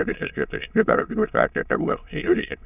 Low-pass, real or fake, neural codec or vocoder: 3.6 kHz; fake; autoencoder, 22.05 kHz, a latent of 192 numbers a frame, VITS, trained on many speakers